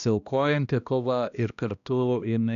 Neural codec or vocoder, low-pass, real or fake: codec, 16 kHz, 1 kbps, X-Codec, HuBERT features, trained on balanced general audio; 7.2 kHz; fake